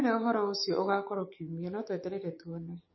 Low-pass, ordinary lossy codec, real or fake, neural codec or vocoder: 7.2 kHz; MP3, 24 kbps; fake; codec, 16 kHz, 6 kbps, DAC